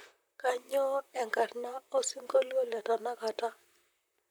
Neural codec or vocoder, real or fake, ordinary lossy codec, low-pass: vocoder, 44.1 kHz, 128 mel bands, Pupu-Vocoder; fake; none; none